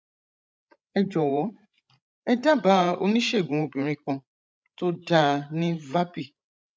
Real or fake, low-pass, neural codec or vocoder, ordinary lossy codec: fake; none; codec, 16 kHz, 16 kbps, FreqCodec, larger model; none